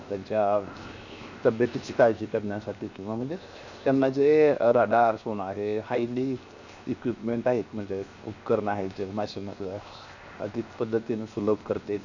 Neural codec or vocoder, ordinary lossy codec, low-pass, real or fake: codec, 16 kHz, 0.7 kbps, FocalCodec; none; 7.2 kHz; fake